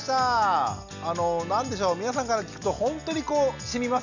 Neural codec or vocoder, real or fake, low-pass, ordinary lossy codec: none; real; 7.2 kHz; none